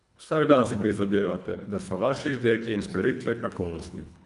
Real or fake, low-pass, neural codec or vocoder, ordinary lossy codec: fake; 10.8 kHz; codec, 24 kHz, 1.5 kbps, HILCodec; none